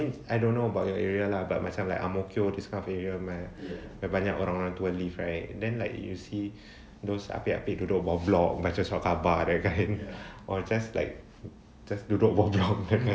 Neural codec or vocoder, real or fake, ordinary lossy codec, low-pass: none; real; none; none